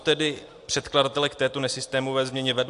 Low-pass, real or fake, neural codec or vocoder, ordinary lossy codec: 10.8 kHz; real; none; AAC, 64 kbps